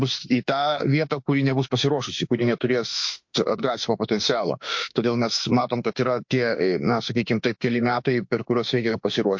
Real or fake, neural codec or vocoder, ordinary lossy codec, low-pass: fake; autoencoder, 48 kHz, 32 numbers a frame, DAC-VAE, trained on Japanese speech; MP3, 48 kbps; 7.2 kHz